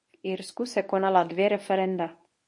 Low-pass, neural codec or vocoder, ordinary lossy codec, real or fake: 10.8 kHz; codec, 24 kHz, 0.9 kbps, WavTokenizer, medium speech release version 1; MP3, 48 kbps; fake